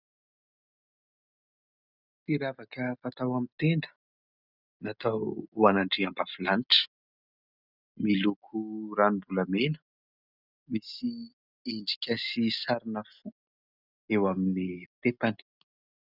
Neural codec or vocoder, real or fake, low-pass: none; real; 5.4 kHz